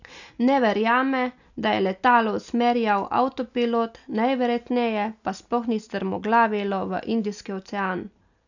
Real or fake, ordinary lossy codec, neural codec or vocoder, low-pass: real; none; none; 7.2 kHz